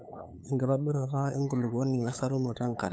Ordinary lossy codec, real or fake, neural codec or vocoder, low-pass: none; fake; codec, 16 kHz, 8 kbps, FunCodec, trained on LibriTTS, 25 frames a second; none